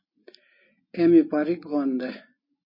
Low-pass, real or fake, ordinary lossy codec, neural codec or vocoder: 5.4 kHz; real; MP3, 24 kbps; none